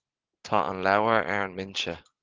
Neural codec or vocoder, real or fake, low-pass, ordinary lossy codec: none; real; 7.2 kHz; Opus, 16 kbps